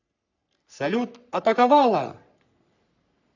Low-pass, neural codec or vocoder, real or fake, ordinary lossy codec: 7.2 kHz; codec, 44.1 kHz, 3.4 kbps, Pupu-Codec; fake; none